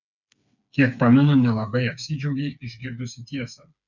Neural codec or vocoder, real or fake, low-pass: codec, 16 kHz, 4 kbps, FreqCodec, smaller model; fake; 7.2 kHz